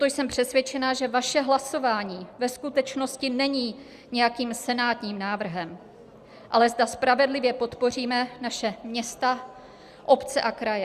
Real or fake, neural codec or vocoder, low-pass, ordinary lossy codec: real; none; 14.4 kHz; Opus, 64 kbps